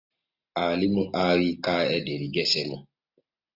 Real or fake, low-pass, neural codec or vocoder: real; 5.4 kHz; none